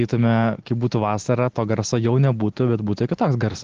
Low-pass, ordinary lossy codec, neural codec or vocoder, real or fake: 7.2 kHz; Opus, 16 kbps; none; real